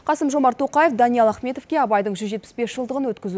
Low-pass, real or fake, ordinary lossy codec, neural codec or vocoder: none; real; none; none